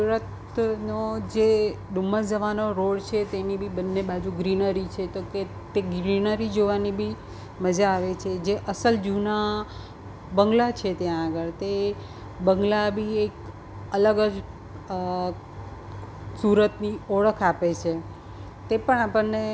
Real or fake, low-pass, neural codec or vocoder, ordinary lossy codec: real; none; none; none